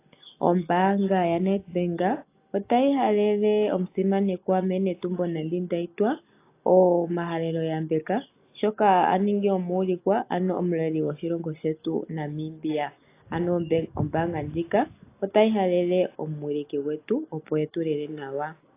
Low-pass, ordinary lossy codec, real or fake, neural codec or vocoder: 3.6 kHz; AAC, 24 kbps; real; none